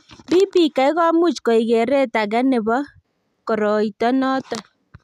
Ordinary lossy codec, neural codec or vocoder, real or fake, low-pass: none; none; real; 14.4 kHz